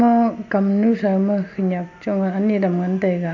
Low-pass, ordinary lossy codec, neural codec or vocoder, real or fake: 7.2 kHz; none; none; real